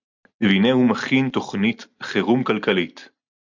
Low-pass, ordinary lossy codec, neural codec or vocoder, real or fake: 7.2 kHz; MP3, 64 kbps; none; real